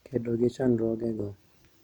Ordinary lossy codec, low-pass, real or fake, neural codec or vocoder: none; 19.8 kHz; real; none